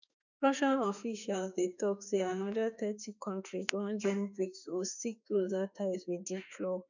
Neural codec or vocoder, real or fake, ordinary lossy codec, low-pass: autoencoder, 48 kHz, 32 numbers a frame, DAC-VAE, trained on Japanese speech; fake; none; 7.2 kHz